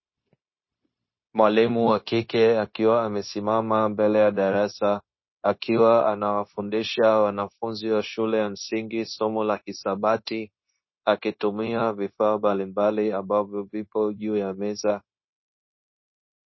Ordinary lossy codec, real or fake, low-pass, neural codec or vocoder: MP3, 24 kbps; fake; 7.2 kHz; codec, 16 kHz, 0.9 kbps, LongCat-Audio-Codec